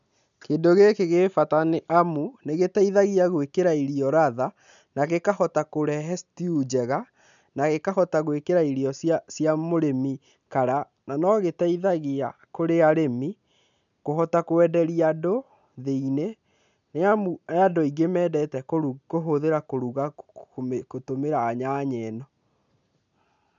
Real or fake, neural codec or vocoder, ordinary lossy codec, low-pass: real; none; none; 7.2 kHz